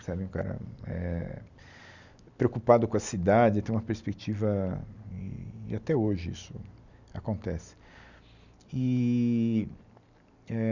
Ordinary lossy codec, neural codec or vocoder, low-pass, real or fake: none; none; 7.2 kHz; real